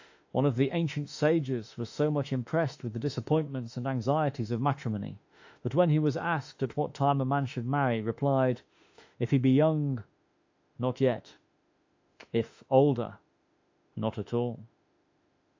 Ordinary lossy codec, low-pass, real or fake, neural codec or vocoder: AAC, 48 kbps; 7.2 kHz; fake; autoencoder, 48 kHz, 32 numbers a frame, DAC-VAE, trained on Japanese speech